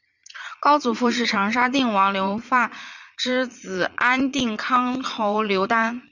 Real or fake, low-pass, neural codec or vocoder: fake; 7.2 kHz; vocoder, 22.05 kHz, 80 mel bands, Vocos